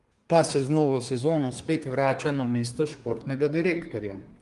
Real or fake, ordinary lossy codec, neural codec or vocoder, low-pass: fake; Opus, 32 kbps; codec, 24 kHz, 1 kbps, SNAC; 10.8 kHz